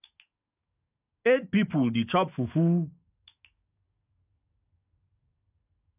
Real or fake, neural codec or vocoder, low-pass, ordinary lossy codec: fake; codec, 44.1 kHz, 7.8 kbps, DAC; 3.6 kHz; AAC, 32 kbps